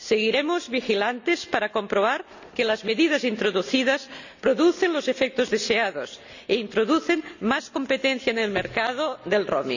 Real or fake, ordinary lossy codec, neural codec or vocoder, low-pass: real; none; none; 7.2 kHz